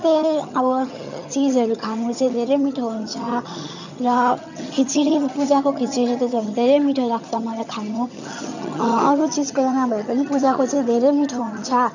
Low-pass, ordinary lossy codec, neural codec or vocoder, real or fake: 7.2 kHz; none; vocoder, 22.05 kHz, 80 mel bands, HiFi-GAN; fake